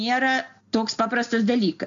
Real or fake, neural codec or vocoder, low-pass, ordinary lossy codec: real; none; 7.2 kHz; AAC, 64 kbps